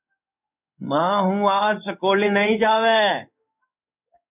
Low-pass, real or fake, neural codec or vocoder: 3.6 kHz; fake; codec, 16 kHz in and 24 kHz out, 1 kbps, XY-Tokenizer